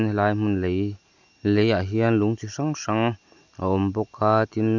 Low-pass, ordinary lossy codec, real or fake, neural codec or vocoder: 7.2 kHz; none; real; none